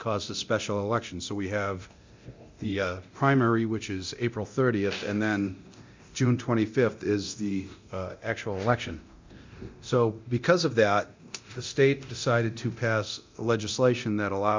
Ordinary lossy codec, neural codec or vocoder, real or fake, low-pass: MP3, 64 kbps; codec, 24 kHz, 0.9 kbps, DualCodec; fake; 7.2 kHz